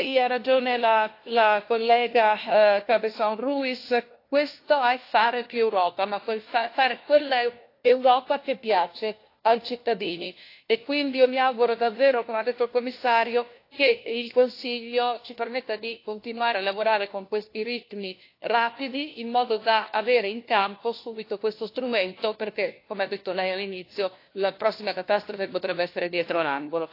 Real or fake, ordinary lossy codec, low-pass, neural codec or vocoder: fake; AAC, 32 kbps; 5.4 kHz; codec, 16 kHz, 1 kbps, FunCodec, trained on LibriTTS, 50 frames a second